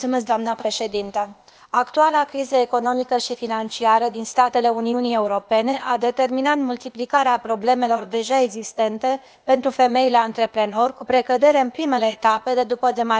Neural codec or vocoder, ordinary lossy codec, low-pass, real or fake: codec, 16 kHz, 0.8 kbps, ZipCodec; none; none; fake